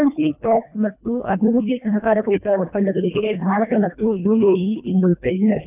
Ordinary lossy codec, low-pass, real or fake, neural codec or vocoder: none; 3.6 kHz; fake; codec, 24 kHz, 1.5 kbps, HILCodec